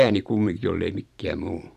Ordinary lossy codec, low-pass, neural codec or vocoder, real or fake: none; 14.4 kHz; vocoder, 44.1 kHz, 128 mel bands every 512 samples, BigVGAN v2; fake